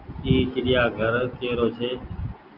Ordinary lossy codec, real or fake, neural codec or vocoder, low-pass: Opus, 24 kbps; real; none; 5.4 kHz